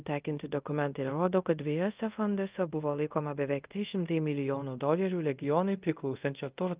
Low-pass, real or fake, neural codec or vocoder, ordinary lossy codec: 3.6 kHz; fake; codec, 24 kHz, 0.5 kbps, DualCodec; Opus, 24 kbps